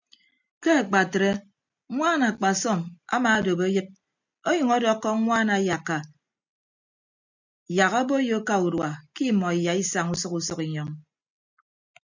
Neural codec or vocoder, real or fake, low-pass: none; real; 7.2 kHz